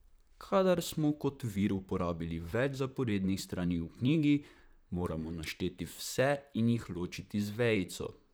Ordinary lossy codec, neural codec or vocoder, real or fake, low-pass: none; vocoder, 44.1 kHz, 128 mel bands, Pupu-Vocoder; fake; none